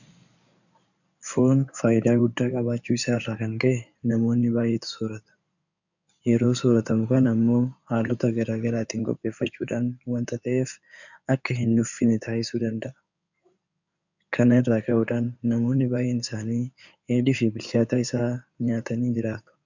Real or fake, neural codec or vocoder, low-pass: fake; codec, 16 kHz in and 24 kHz out, 2.2 kbps, FireRedTTS-2 codec; 7.2 kHz